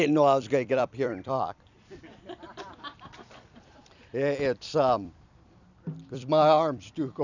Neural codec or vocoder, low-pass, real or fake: vocoder, 44.1 kHz, 128 mel bands every 256 samples, BigVGAN v2; 7.2 kHz; fake